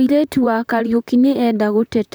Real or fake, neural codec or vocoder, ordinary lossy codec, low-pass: fake; vocoder, 44.1 kHz, 128 mel bands, Pupu-Vocoder; none; none